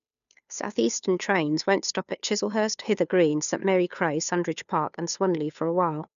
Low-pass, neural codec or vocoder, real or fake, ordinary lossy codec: 7.2 kHz; codec, 16 kHz, 2 kbps, FunCodec, trained on Chinese and English, 25 frames a second; fake; none